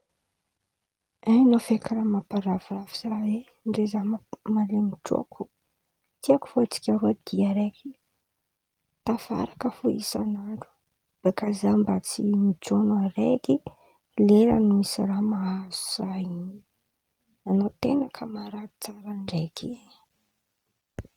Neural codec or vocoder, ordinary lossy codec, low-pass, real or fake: none; Opus, 32 kbps; 19.8 kHz; real